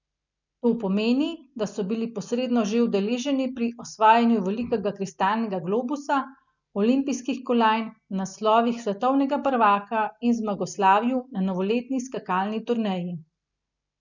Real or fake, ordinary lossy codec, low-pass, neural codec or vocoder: real; none; 7.2 kHz; none